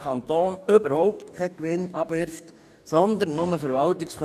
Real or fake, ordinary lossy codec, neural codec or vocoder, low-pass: fake; none; codec, 44.1 kHz, 2.6 kbps, DAC; 14.4 kHz